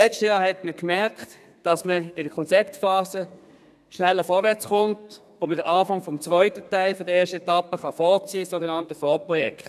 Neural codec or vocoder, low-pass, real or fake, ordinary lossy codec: codec, 32 kHz, 1.9 kbps, SNAC; 14.4 kHz; fake; none